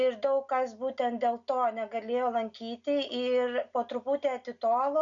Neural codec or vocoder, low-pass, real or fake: none; 7.2 kHz; real